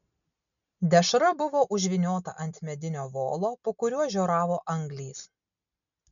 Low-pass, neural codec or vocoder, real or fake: 7.2 kHz; none; real